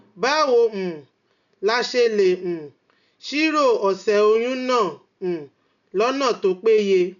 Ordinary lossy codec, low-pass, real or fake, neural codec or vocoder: none; 7.2 kHz; real; none